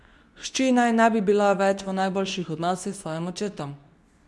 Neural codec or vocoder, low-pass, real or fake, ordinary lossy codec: codec, 24 kHz, 0.9 kbps, WavTokenizer, medium speech release version 2; none; fake; none